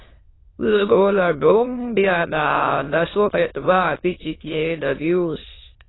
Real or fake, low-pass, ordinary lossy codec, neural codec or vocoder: fake; 7.2 kHz; AAC, 16 kbps; autoencoder, 22.05 kHz, a latent of 192 numbers a frame, VITS, trained on many speakers